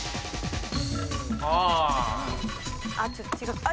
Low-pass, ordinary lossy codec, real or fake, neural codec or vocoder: none; none; real; none